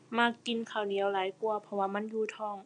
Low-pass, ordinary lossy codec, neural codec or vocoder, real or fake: 9.9 kHz; none; none; real